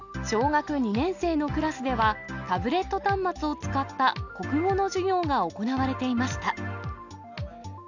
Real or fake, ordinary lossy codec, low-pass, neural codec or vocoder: real; none; 7.2 kHz; none